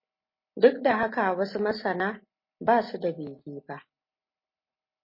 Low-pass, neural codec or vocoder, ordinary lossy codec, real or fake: 5.4 kHz; none; MP3, 24 kbps; real